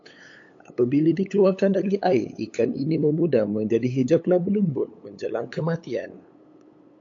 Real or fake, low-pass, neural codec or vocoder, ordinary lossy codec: fake; 7.2 kHz; codec, 16 kHz, 8 kbps, FunCodec, trained on LibriTTS, 25 frames a second; AAC, 48 kbps